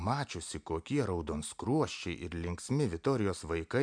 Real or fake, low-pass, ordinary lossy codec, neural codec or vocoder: real; 9.9 kHz; MP3, 64 kbps; none